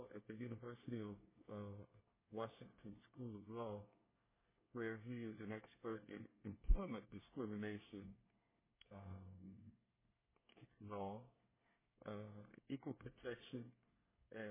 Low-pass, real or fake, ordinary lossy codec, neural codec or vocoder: 3.6 kHz; fake; MP3, 16 kbps; codec, 24 kHz, 1 kbps, SNAC